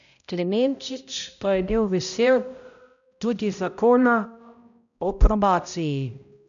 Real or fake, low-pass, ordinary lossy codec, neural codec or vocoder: fake; 7.2 kHz; none; codec, 16 kHz, 0.5 kbps, X-Codec, HuBERT features, trained on balanced general audio